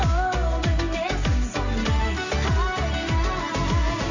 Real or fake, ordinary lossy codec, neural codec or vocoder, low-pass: real; none; none; 7.2 kHz